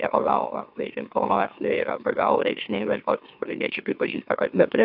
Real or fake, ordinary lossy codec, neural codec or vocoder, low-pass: fake; AAC, 48 kbps; autoencoder, 44.1 kHz, a latent of 192 numbers a frame, MeloTTS; 5.4 kHz